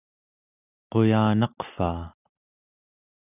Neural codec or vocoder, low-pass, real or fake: none; 3.6 kHz; real